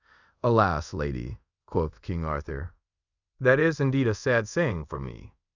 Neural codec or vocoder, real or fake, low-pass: codec, 24 kHz, 0.5 kbps, DualCodec; fake; 7.2 kHz